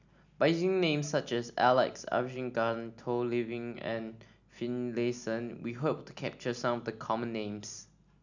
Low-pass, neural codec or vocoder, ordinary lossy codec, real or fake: 7.2 kHz; none; none; real